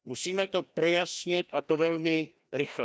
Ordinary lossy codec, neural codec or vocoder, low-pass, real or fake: none; codec, 16 kHz, 1 kbps, FreqCodec, larger model; none; fake